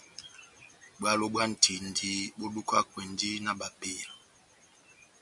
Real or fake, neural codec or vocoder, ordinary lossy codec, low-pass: real; none; MP3, 96 kbps; 10.8 kHz